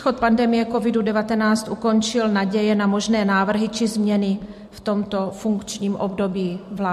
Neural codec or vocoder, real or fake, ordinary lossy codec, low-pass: vocoder, 44.1 kHz, 128 mel bands every 256 samples, BigVGAN v2; fake; MP3, 64 kbps; 14.4 kHz